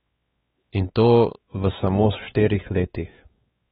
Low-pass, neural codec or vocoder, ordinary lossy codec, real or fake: 7.2 kHz; codec, 16 kHz, 4 kbps, X-Codec, WavLM features, trained on Multilingual LibriSpeech; AAC, 16 kbps; fake